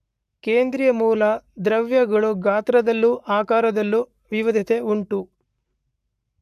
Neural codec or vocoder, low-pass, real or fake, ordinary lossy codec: codec, 44.1 kHz, 7.8 kbps, Pupu-Codec; 14.4 kHz; fake; none